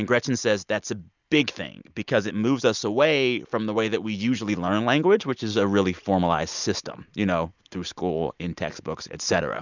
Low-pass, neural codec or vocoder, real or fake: 7.2 kHz; none; real